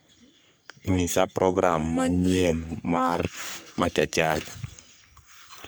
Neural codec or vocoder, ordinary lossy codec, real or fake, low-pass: codec, 44.1 kHz, 3.4 kbps, Pupu-Codec; none; fake; none